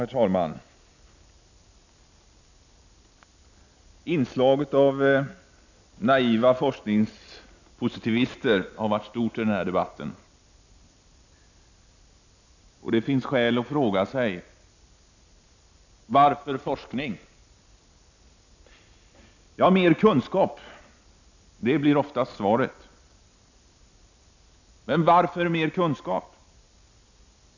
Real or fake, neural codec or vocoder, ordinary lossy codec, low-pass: real; none; none; 7.2 kHz